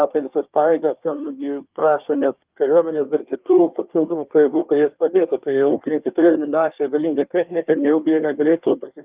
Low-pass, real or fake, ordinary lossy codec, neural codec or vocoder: 3.6 kHz; fake; Opus, 24 kbps; codec, 24 kHz, 1 kbps, SNAC